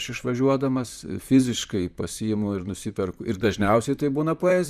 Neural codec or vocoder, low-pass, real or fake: vocoder, 44.1 kHz, 128 mel bands, Pupu-Vocoder; 14.4 kHz; fake